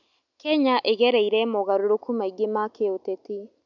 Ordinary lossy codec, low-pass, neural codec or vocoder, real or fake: none; 7.2 kHz; none; real